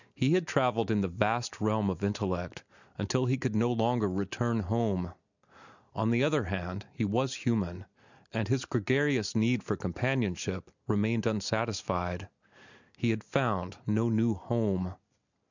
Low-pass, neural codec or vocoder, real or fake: 7.2 kHz; none; real